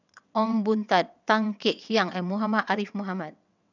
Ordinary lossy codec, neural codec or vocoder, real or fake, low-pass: none; vocoder, 22.05 kHz, 80 mel bands, WaveNeXt; fake; 7.2 kHz